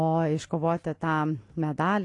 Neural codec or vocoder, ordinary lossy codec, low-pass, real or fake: none; AAC, 48 kbps; 10.8 kHz; real